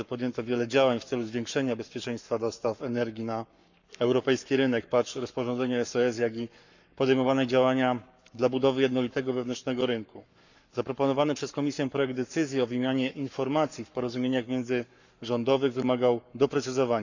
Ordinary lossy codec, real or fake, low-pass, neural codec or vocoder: none; fake; 7.2 kHz; codec, 44.1 kHz, 7.8 kbps, Pupu-Codec